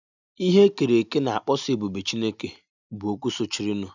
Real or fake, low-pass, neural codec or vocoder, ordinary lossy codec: real; 7.2 kHz; none; none